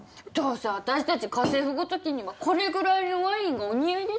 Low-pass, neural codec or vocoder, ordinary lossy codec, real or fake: none; none; none; real